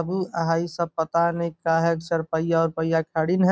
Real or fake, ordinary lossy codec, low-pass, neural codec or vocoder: real; none; none; none